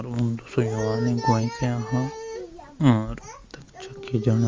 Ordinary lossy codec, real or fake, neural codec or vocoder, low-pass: Opus, 32 kbps; real; none; 7.2 kHz